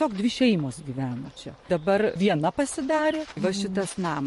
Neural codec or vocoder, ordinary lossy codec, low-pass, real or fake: vocoder, 44.1 kHz, 128 mel bands every 512 samples, BigVGAN v2; MP3, 48 kbps; 14.4 kHz; fake